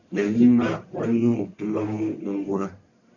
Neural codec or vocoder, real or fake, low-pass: codec, 44.1 kHz, 1.7 kbps, Pupu-Codec; fake; 7.2 kHz